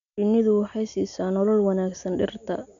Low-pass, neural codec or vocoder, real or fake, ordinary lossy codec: 7.2 kHz; none; real; MP3, 96 kbps